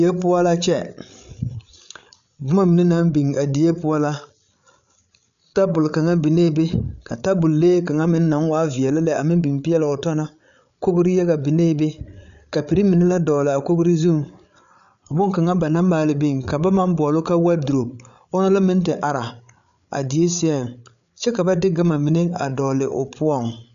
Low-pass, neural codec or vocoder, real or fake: 7.2 kHz; codec, 16 kHz, 8 kbps, FreqCodec, larger model; fake